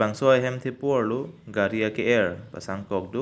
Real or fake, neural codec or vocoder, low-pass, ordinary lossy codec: real; none; none; none